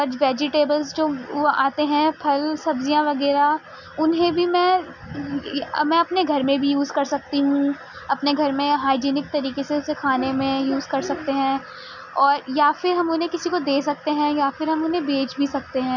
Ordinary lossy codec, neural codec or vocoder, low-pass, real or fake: none; none; 7.2 kHz; real